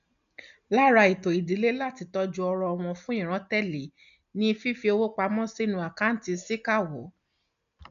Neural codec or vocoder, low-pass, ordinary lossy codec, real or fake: none; 7.2 kHz; none; real